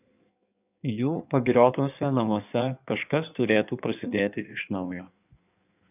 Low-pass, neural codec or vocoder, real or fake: 3.6 kHz; codec, 16 kHz in and 24 kHz out, 1.1 kbps, FireRedTTS-2 codec; fake